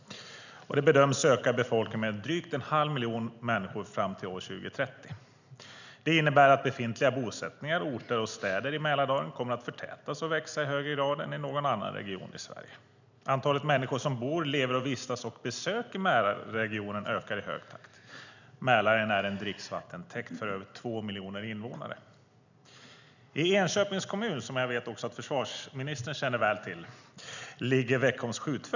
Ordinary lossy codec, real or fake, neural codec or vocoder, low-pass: none; real; none; 7.2 kHz